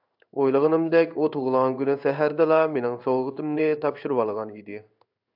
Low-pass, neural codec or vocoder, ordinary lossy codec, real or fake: 5.4 kHz; codec, 16 kHz in and 24 kHz out, 1 kbps, XY-Tokenizer; AAC, 48 kbps; fake